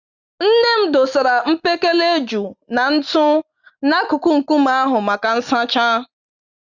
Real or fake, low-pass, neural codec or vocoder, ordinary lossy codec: real; 7.2 kHz; none; Opus, 64 kbps